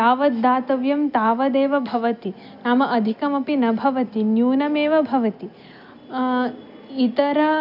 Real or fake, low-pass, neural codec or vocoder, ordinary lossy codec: real; 5.4 kHz; none; none